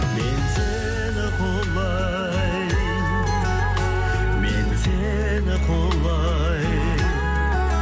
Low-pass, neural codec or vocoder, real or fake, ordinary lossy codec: none; none; real; none